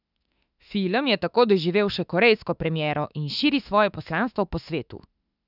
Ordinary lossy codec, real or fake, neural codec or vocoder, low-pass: none; fake; autoencoder, 48 kHz, 32 numbers a frame, DAC-VAE, trained on Japanese speech; 5.4 kHz